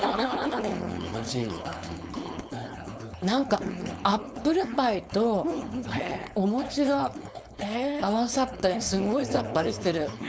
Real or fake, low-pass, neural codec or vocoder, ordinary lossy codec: fake; none; codec, 16 kHz, 4.8 kbps, FACodec; none